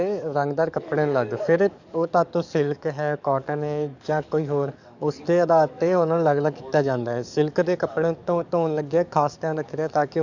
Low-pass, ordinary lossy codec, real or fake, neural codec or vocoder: 7.2 kHz; none; fake; codec, 44.1 kHz, 7.8 kbps, DAC